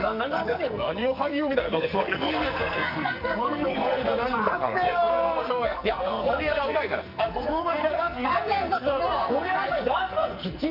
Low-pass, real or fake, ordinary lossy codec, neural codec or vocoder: 5.4 kHz; fake; none; codec, 44.1 kHz, 2.6 kbps, SNAC